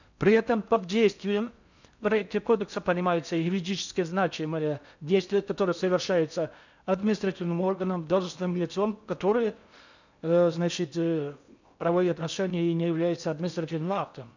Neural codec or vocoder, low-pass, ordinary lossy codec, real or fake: codec, 16 kHz in and 24 kHz out, 0.6 kbps, FocalCodec, streaming, 4096 codes; 7.2 kHz; none; fake